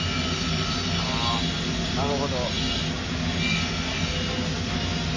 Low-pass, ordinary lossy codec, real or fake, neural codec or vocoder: 7.2 kHz; AAC, 48 kbps; real; none